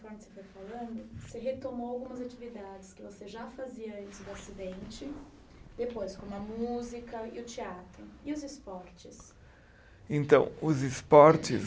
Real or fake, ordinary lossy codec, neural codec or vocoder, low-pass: real; none; none; none